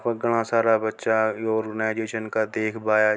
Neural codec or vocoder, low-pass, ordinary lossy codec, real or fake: none; none; none; real